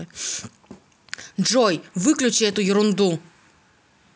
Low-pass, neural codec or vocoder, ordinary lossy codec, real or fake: none; none; none; real